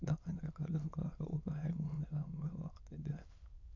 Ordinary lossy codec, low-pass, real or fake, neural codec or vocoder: none; 7.2 kHz; fake; autoencoder, 22.05 kHz, a latent of 192 numbers a frame, VITS, trained on many speakers